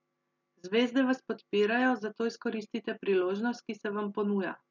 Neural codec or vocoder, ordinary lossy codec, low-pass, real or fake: none; none; 7.2 kHz; real